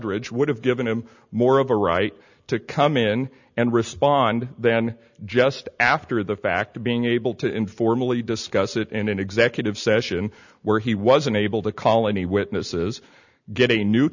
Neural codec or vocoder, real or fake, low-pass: none; real; 7.2 kHz